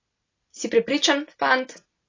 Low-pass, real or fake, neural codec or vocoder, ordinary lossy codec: 7.2 kHz; real; none; AAC, 32 kbps